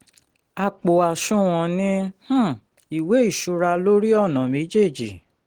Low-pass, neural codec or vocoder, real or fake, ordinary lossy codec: 19.8 kHz; none; real; Opus, 16 kbps